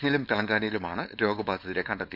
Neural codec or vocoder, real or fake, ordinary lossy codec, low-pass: codec, 16 kHz, 4.8 kbps, FACodec; fake; none; 5.4 kHz